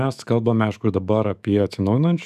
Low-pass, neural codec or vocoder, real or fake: 14.4 kHz; autoencoder, 48 kHz, 128 numbers a frame, DAC-VAE, trained on Japanese speech; fake